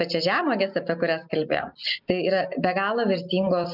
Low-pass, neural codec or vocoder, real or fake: 5.4 kHz; none; real